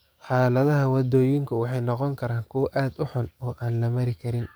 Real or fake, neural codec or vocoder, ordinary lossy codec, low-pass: fake; codec, 44.1 kHz, 7.8 kbps, DAC; none; none